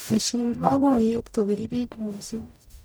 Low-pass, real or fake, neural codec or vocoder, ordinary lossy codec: none; fake; codec, 44.1 kHz, 0.9 kbps, DAC; none